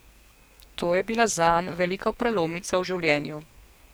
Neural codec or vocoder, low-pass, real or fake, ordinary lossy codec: codec, 44.1 kHz, 2.6 kbps, SNAC; none; fake; none